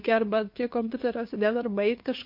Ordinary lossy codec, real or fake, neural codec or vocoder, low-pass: MP3, 32 kbps; fake; codec, 16 kHz, 2 kbps, FunCodec, trained on LibriTTS, 25 frames a second; 5.4 kHz